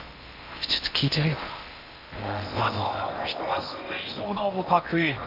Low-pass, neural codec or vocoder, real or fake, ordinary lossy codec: 5.4 kHz; codec, 16 kHz in and 24 kHz out, 0.8 kbps, FocalCodec, streaming, 65536 codes; fake; AAC, 48 kbps